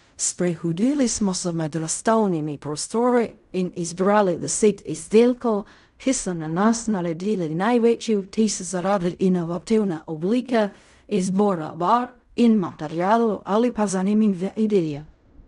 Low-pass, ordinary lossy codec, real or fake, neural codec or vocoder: 10.8 kHz; none; fake; codec, 16 kHz in and 24 kHz out, 0.4 kbps, LongCat-Audio-Codec, fine tuned four codebook decoder